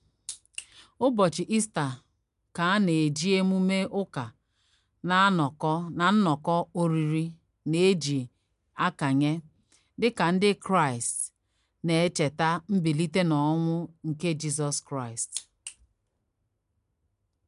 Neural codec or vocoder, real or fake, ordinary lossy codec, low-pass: none; real; MP3, 96 kbps; 10.8 kHz